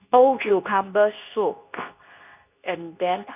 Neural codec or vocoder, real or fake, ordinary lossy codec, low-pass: codec, 24 kHz, 0.9 kbps, WavTokenizer, medium speech release version 2; fake; none; 3.6 kHz